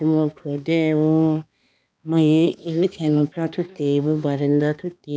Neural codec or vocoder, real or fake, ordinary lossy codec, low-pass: codec, 16 kHz, 2 kbps, X-Codec, HuBERT features, trained on balanced general audio; fake; none; none